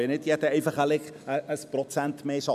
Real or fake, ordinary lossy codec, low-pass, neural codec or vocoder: real; none; 14.4 kHz; none